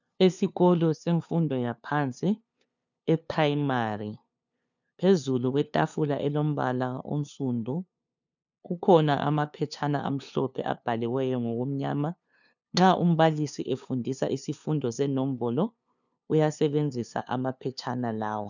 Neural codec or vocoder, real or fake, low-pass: codec, 16 kHz, 2 kbps, FunCodec, trained on LibriTTS, 25 frames a second; fake; 7.2 kHz